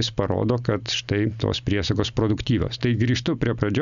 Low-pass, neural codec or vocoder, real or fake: 7.2 kHz; none; real